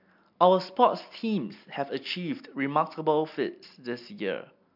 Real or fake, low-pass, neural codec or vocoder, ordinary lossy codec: real; 5.4 kHz; none; MP3, 48 kbps